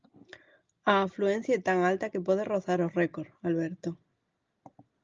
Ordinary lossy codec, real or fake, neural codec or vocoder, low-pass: Opus, 24 kbps; real; none; 7.2 kHz